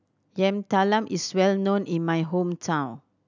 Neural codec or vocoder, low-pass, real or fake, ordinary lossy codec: none; 7.2 kHz; real; none